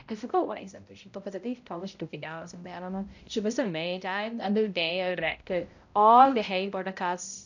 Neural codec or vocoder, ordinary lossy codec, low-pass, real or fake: codec, 16 kHz, 0.5 kbps, X-Codec, HuBERT features, trained on balanced general audio; none; 7.2 kHz; fake